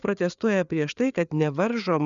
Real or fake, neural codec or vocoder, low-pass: fake; codec, 16 kHz, 4 kbps, FreqCodec, larger model; 7.2 kHz